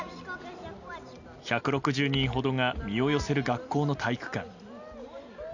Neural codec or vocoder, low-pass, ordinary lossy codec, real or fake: none; 7.2 kHz; none; real